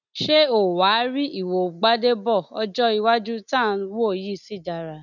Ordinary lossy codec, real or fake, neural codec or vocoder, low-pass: none; real; none; 7.2 kHz